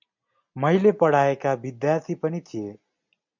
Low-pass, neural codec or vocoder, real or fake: 7.2 kHz; none; real